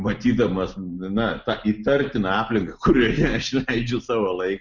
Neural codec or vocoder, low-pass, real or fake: none; 7.2 kHz; real